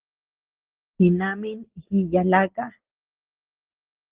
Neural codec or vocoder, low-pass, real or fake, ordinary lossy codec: none; 3.6 kHz; real; Opus, 16 kbps